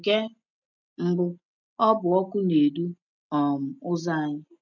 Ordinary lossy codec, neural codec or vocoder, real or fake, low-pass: none; none; real; 7.2 kHz